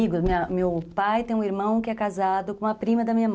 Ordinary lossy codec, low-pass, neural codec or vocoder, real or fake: none; none; none; real